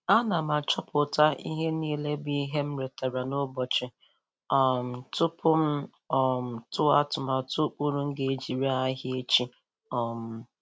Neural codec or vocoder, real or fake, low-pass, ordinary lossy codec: none; real; none; none